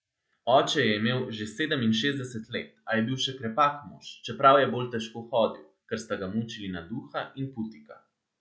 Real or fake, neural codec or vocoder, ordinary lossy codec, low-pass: real; none; none; none